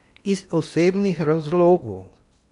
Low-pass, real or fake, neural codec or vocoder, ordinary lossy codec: 10.8 kHz; fake; codec, 16 kHz in and 24 kHz out, 0.8 kbps, FocalCodec, streaming, 65536 codes; none